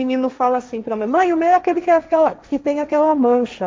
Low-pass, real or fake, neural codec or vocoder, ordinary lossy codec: none; fake; codec, 16 kHz, 1.1 kbps, Voila-Tokenizer; none